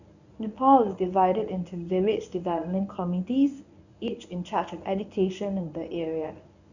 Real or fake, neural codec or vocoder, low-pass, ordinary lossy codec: fake; codec, 24 kHz, 0.9 kbps, WavTokenizer, medium speech release version 1; 7.2 kHz; none